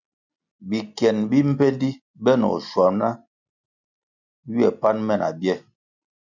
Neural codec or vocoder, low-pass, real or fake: none; 7.2 kHz; real